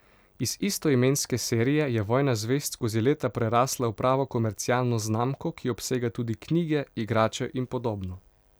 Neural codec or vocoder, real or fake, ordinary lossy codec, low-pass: none; real; none; none